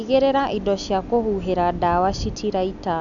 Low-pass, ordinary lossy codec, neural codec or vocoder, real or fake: 7.2 kHz; none; none; real